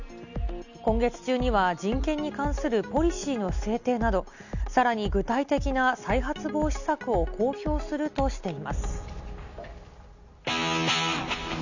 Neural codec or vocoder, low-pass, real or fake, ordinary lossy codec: none; 7.2 kHz; real; none